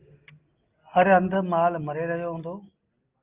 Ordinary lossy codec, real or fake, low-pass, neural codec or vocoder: Opus, 24 kbps; real; 3.6 kHz; none